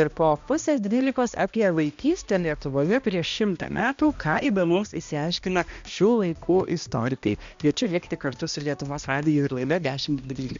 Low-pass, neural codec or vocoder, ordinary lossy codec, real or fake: 7.2 kHz; codec, 16 kHz, 1 kbps, X-Codec, HuBERT features, trained on balanced general audio; MP3, 64 kbps; fake